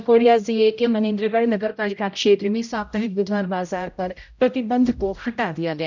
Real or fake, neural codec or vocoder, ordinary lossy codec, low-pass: fake; codec, 16 kHz, 0.5 kbps, X-Codec, HuBERT features, trained on general audio; none; 7.2 kHz